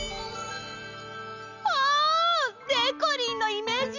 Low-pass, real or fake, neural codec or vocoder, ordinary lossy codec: 7.2 kHz; real; none; none